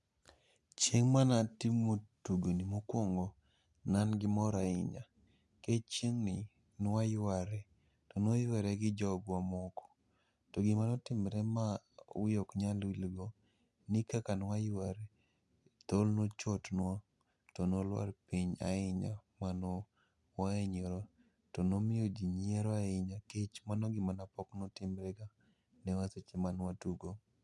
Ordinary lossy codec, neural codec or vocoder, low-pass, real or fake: none; none; none; real